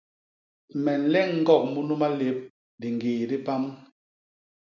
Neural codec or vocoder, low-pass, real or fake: none; 7.2 kHz; real